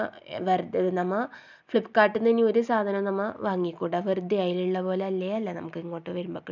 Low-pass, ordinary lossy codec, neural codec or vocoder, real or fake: 7.2 kHz; none; none; real